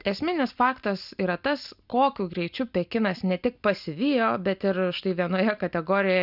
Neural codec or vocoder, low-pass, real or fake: none; 5.4 kHz; real